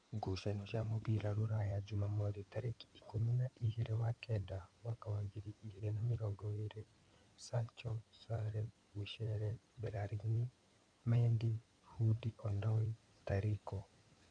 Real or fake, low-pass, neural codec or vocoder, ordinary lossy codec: fake; 9.9 kHz; codec, 16 kHz in and 24 kHz out, 2.2 kbps, FireRedTTS-2 codec; none